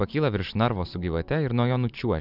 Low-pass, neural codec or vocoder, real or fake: 5.4 kHz; vocoder, 44.1 kHz, 128 mel bands every 512 samples, BigVGAN v2; fake